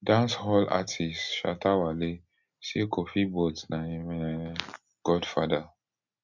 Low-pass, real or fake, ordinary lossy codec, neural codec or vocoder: 7.2 kHz; real; none; none